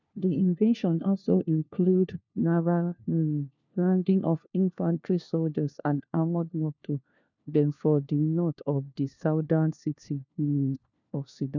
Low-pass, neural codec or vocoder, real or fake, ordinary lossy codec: 7.2 kHz; codec, 16 kHz, 1 kbps, FunCodec, trained on LibriTTS, 50 frames a second; fake; none